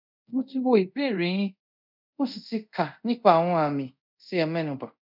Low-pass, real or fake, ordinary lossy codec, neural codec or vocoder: 5.4 kHz; fake; none; codec, 24 kHz, 0.5 kbps, DualCodec